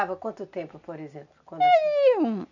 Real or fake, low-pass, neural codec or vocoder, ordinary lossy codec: real; 7.2 kHz; none; none